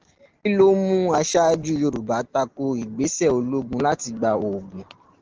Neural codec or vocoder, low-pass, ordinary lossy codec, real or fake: none; 7.2 kHz; Opus, 16 kbps; real